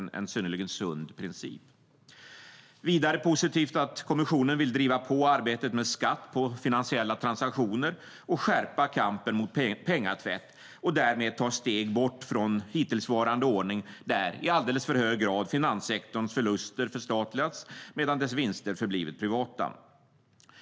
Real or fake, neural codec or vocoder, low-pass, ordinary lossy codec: real; none; none; none